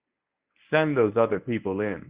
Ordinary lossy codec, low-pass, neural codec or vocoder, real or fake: Opus, 64 kbps; 3.6 kHz; codec, 24 kHz, 0.9 kbps, WavTokenizer, medium speech release version 1; fake